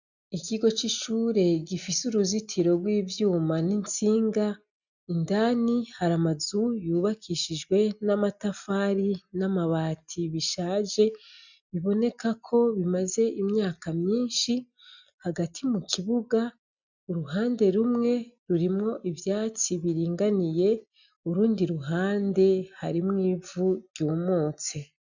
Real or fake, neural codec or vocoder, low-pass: real; none; 7.2 kHz